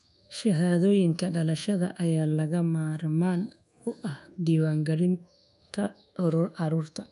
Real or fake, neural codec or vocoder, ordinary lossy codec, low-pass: fake; codec, 24 kHz, 1.2 kbps, DualCodec; none; 10.8 kHz